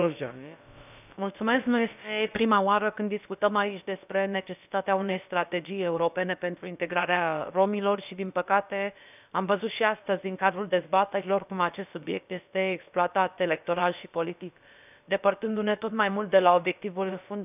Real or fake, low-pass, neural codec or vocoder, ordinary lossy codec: fake; 3.6 kHz; codec, 16 kHz, about 1 kbps, DyCAST, with the encoder's durations; none